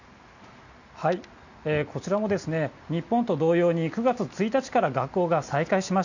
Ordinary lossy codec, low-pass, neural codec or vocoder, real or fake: none; 7.2 kHz; none; real